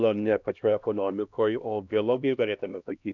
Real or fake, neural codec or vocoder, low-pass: fake; codec, 16 kHz, 1 kbps, X-Codec, HuBERT features, trained on LibriSpeech; 7.2 kHz